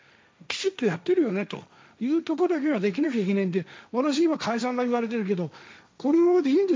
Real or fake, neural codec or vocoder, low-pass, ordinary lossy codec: fake; codec, 16 kHz, 1.1 kbps, Voila-Tokenizer; none; none